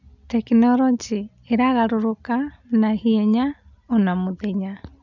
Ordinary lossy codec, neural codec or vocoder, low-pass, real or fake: none; none; 7.2 kHz; real